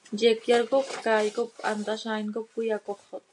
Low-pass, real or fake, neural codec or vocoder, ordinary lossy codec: 10.8 kHz; real; none; AAC, 64 kbps